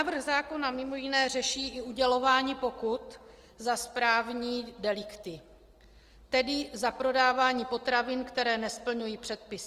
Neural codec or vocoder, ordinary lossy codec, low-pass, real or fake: none; Opus, 24 kbps; 14.4 kHz; real